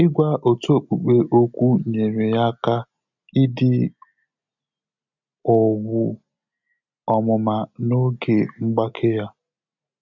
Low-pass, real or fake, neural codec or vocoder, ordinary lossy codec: 7.2 kHz; real; none; none